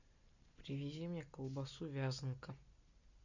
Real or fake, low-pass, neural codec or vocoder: real; 7.2 kHz; none